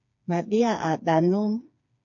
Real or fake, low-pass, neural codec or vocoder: fake; 7.2 kHz; codec, 16 kHz, 4 kbps, FreqCodec, smaller model